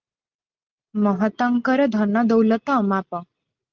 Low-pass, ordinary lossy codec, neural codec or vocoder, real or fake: 7.2 kHz; Opus, 32 kbps; none; real